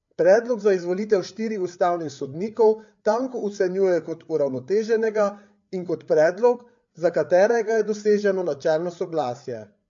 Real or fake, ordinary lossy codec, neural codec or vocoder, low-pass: fake; MP3, 48 kbps; codec, 16 kHz, 8 kbps, FreqCodec, larger model; 7.2 kHz